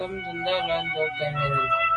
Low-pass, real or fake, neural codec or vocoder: 10.8 kHz; real; none